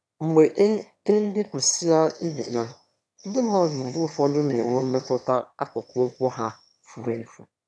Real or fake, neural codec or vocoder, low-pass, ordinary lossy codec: fake; autoencoder, 22.05 kHz, a latent of 192 numbers a frame, VITS, trained on one speaker; none; none